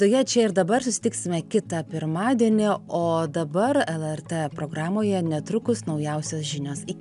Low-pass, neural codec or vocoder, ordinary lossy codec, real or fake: 10.8 kHz; none; AAC, 96 kbps; real